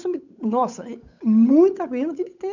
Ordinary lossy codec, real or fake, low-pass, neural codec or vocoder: none; fake; 7.2 kHz; codec, 16 kHz, 8 kbps, FunCodec, trained on Chinese and English, 25 frames a second